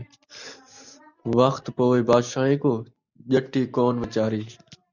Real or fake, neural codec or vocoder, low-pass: real; none; 7.2 kHz